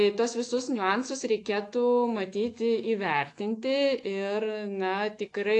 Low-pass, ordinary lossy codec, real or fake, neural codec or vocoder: 10.8 kHz; AAC, 48 kbps; fake; autoencoder, 48 kHz, 128 numbers a frame, DAC-VAE, trained on Japanese speech